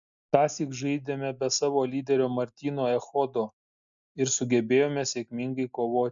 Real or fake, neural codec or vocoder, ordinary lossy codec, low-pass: real; none; MP3, 64 kbps; 7.2 kHz